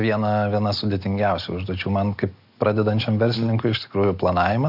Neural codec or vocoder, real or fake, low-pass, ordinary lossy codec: none; real; 5.4 kHz; AAC, 48 kbps